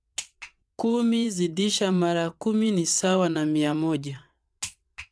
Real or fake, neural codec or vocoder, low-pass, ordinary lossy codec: fake; vocoder, 22.05 kHz, 80 mel bands, WaveNeXt; none; none